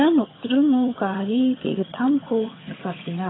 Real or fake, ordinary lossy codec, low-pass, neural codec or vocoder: fake; AAC, 16 kbps; 7.2 kHz; vocoder, 22.05 kHz, 80 mel bands, HiFi-GAN